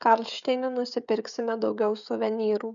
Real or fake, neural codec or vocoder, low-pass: fake; codec, 16 kHz, 16 kbps, FreqCodec, smaller model; 7.2 kHz